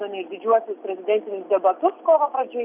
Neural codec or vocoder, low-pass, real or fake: none; 3.6 kHz; real